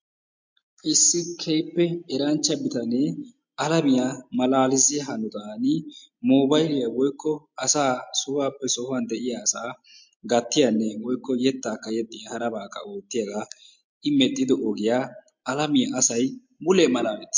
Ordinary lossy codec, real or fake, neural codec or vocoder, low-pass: MP3, 48 kbps; real; none; 7.2 kHz